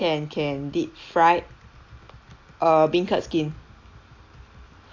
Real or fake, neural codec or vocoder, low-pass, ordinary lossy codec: real; none; 7.2 kHz; AAC, 48 kbps